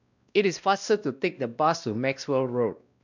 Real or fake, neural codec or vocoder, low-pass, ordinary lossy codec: fake; codec, 16 kHz, 1 kbps, X-Codec, WavLM features, trained on Multilingual LibriSpeech; 7.2 kHz; none